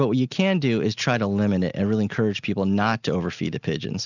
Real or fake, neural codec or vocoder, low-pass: real; none; 7.2 kHz